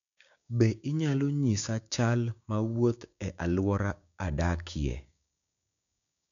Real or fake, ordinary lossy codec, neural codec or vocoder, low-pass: fake; none; codec, 16 kHz, 6 kbps, DAC; 7.2 kHz